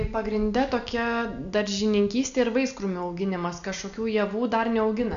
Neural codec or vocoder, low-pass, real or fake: none; 7.2 kHz; real